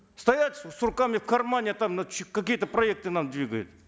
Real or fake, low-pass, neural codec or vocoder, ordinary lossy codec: real; none; none; none